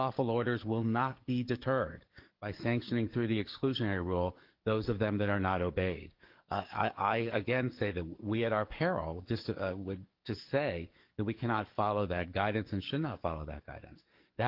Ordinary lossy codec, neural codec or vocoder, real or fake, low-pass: Opus, 32 kbps; codec, 44.1 kHz, 7.8 kbps, DAC; fake; 5.4 kHz